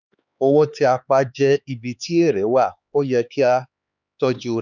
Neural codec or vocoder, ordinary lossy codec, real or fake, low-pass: codec, 16 kHz, 4 kbps, X-Codec, HuBERT features, trained on LibriSpeech; none; fake; 7.2 kHz